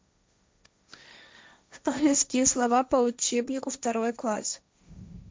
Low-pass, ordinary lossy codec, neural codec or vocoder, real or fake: none; none; codec, 16 kHz, 1.1 kbps, Voila-Tokenizer; fake